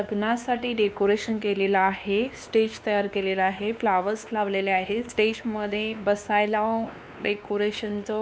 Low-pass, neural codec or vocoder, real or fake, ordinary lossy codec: none; codec, 16 kHz, 2 kbps, X-Codec, WavLM features, trained on Multilingual LibriSpeech; fake; none